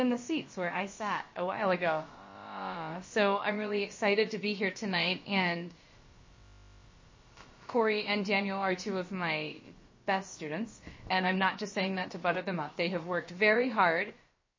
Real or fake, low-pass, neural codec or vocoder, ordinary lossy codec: fake; 7.2 kHz; codec, 16 kHz, about 1 kbps, DyCAST, with the encoder's durations; MP3, 32 kbps